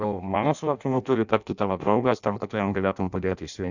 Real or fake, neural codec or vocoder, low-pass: fake; codec, 16 kHz in and 24 kHz out, 0.6 kbps, FireRedTTS-2 codec; 7.2 kHz